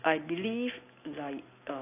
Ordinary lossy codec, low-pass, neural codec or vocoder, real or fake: AAC, 24 kbps; 3.6 kHz; none; real